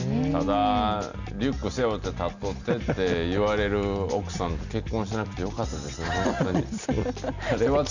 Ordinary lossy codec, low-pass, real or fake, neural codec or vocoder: none; 7.2 kHz; real; none